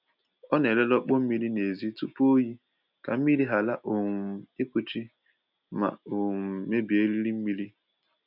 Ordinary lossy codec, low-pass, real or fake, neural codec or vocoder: none; 5.4 kHz; real; none